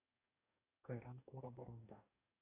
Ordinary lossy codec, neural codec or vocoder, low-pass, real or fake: AAC, 24 kbps; codec, 44.1 kHz, 2.6 kbps, DAC; 3.6 kHz; fake